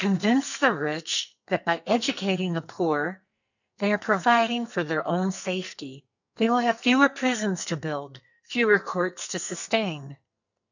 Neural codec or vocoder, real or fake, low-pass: codec, 44.1 kHz, 2.6 kbps, SNAC; fake; 7.2 kHz